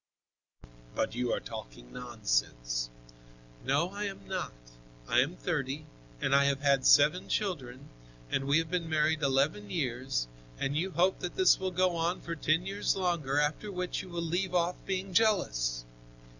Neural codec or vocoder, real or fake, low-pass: none; real; 7.2 kHz